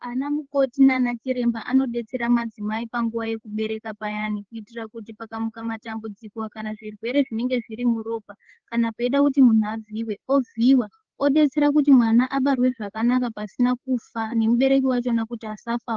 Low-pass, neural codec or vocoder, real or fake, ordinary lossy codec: 7.2 kHz; codec, 16 kHz, 4 kbps, FreqCodec, larger model; fake; Opus, 16 kbps